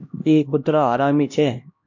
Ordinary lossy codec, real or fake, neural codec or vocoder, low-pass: MP3, 48 kbps; fake; codec, 16 kHz, 1 kbps, X-Codec, HuBERT features, trained on LibriSpeech; 7.2 kHz